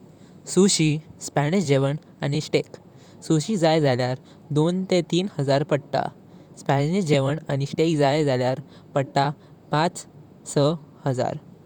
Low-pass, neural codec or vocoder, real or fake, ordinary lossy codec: 19.8 kHz; vocoder, 44.1 kHz, 128 mel bands, Pupu-Vocoder; fake; none